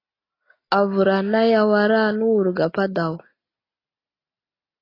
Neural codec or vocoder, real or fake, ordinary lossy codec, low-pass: none; real; AAC, 24 kbps; 5.4 kHz